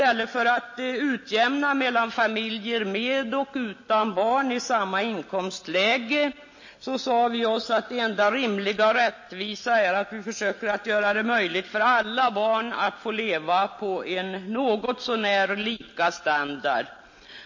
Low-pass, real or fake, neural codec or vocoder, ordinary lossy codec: 7.2 kHz; real; none; MP3, 32 kbps